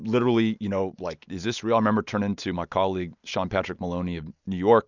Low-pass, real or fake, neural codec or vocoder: 7.2 kHz; real; none